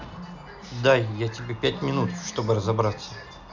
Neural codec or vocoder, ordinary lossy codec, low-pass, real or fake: none; none; 7.2 kHz; real